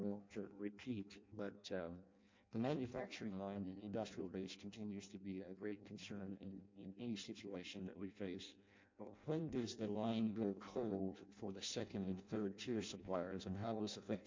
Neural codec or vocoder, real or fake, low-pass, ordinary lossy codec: codec, 16 kHz in and 24 kHz out, 0.6 kbps, FireRedTTS-2 codec; fake; 7.2 kHz; MP3, 48 kbps